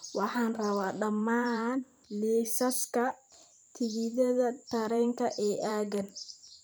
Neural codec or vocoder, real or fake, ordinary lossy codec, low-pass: vocoder, 44.1 kHz, 128 mel bands every 512 samples, BigVGAN v2; fake; none; none